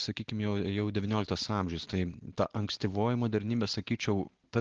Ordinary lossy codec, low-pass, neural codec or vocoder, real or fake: Opus, 16 kbps; 7.2 kHz; none; real